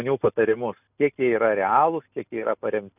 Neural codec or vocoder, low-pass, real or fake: none; 3.6 kHz; real